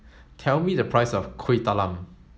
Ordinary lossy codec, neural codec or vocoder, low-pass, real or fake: none; none; none; real